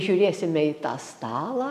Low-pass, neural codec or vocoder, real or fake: 14.4 kHz; none; real